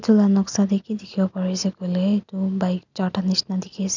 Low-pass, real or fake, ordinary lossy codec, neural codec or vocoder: 7.2 kHz; real; none; none